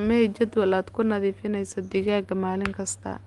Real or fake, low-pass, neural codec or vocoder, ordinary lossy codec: real; 10.8 kHz; none; Opus, 24 kbps